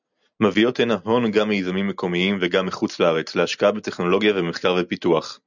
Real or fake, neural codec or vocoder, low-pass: real; none; 7.2 kHz